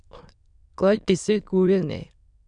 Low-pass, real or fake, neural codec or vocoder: 9.9 kHz; fake; autoencoder, 22.05 kHz, a latent of 192 numbers a frame, VITS, trained on many speakers